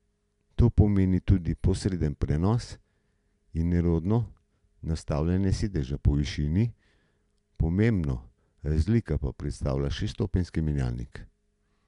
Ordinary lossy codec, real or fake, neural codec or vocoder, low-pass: MP3, 96 kbps; real; none; 10.8 kHz